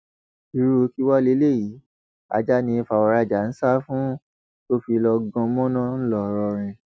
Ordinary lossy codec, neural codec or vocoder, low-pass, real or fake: none; none; none; real